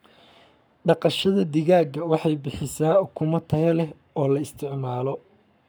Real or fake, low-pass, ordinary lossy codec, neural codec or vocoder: fake; none; none; codec, 44.1 kHz, 7.8 kbps, Pupu-Codec